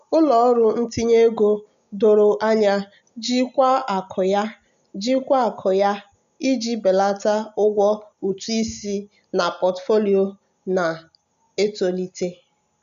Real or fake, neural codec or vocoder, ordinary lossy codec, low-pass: real; none; none; 7.2 kHz